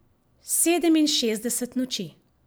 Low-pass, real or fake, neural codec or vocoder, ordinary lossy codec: none; real; none; none